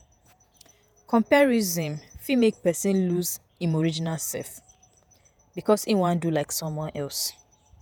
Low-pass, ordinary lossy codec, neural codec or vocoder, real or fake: none; none; vocoder, 48 kHz, 128 mel bands, Vocos; fake